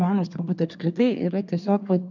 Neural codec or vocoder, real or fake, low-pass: codec, 24 kHz, 1 kbps, SNAC; fake; 7.2 kHz